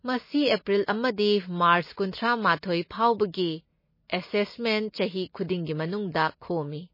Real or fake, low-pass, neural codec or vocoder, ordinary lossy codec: real; 5.4 kHz; none; MP3, 24 kbps